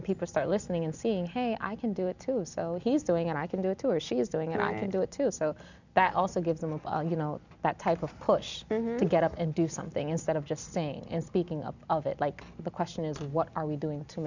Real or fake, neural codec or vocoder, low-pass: real; none; 7.2 kHz